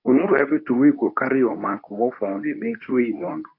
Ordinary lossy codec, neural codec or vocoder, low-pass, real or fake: AAC, 32 kbps; codec, 24 kHz, 0.9 kbps, WavTokenizer, medium speech release version 2; 5.4 kHz; fake